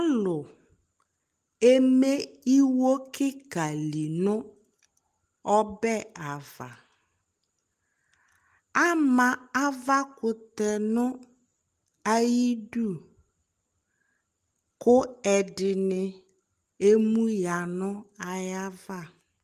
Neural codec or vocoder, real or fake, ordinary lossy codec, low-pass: none; real; Opus, 24 kbps; 14.4 kHz